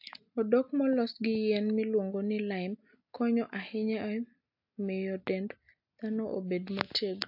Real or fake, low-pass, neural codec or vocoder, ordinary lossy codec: real; 5.4 kHz; none; AAC, 48 kbps